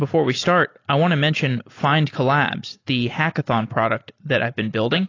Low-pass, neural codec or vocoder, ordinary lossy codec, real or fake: 7.2 kHz; none; AAC, 32 kbps; real